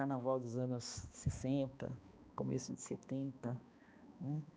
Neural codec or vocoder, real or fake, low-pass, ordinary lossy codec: codec, 16 kHz, 2 kbps, X-Codec, HuBERT features, trained on balanced general audio; fake; none; none